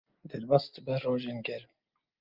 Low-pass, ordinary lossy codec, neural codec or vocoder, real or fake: 5.4 kHz; Opus, 24 kbps; none; real